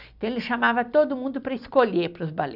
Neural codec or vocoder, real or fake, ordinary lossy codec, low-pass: none; real; none; 5.4 kHz